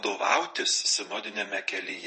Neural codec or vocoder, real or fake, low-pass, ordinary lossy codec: vocoder, 22.05 kHz, 80 mel bands, WaveNeXt; fake; 9.9 kHz; MP3, 32 kbps